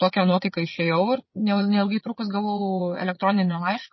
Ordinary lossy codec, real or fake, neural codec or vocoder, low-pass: MP3, 24 kbps; fake; vocoder, 22.05 kHz, 80 mel bands, WaveNeXt; 7.2 kHz